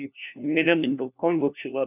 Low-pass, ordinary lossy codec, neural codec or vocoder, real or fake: 3.6 kHz; none; codec, 16 kHz, 1 kbps, FunCodec, trained on LibriTTS, 50 frames a second; fake